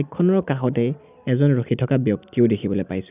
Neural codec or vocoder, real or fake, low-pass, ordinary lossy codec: none; real; 3.6 kHz; none